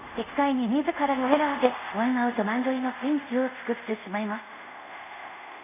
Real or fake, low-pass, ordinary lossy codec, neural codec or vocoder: fake; 3.6 kHz; none; codec, 24 kHz, 0.5 kbps, DualCodec